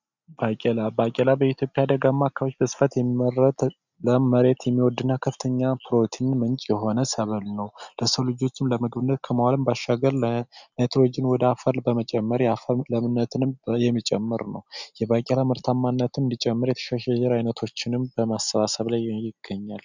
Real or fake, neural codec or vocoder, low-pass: real; none; 7.2 kHz